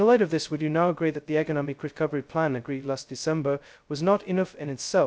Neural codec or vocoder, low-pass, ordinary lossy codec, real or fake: codec, 16 kHz, 0.2 kbps, FocalCodec; none; none; fake